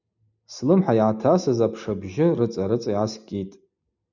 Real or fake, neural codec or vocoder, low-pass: real; none; 7.2 kHz